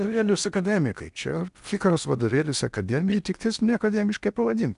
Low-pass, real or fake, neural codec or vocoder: 10.8 kHz; fake; codec, 16 kHz in and 24 kHz out, 0.6 kbps, FocalCodec, streaming, 4096 codes